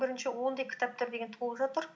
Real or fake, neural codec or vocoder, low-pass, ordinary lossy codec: real; none; none; none